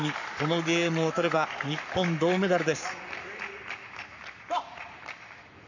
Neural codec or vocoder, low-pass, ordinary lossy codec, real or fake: codec, 44.1 kHz, 7.8 kbps, Pupu-Codec; 7.2 kHz; none; fake